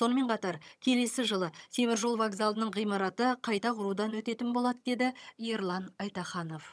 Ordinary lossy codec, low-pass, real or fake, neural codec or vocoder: none; none; fake; vocoder, 22.05 kHz, 80 mel bands, HiFi-GAN